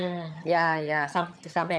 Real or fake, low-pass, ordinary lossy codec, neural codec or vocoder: fake; none; none; vocoder, 22.05 kHz, 80 mel bands, HiFi-GAN